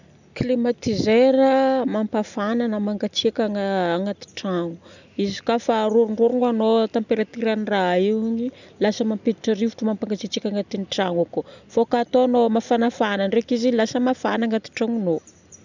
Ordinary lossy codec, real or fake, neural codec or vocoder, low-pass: none; real; none; 7.2 kHz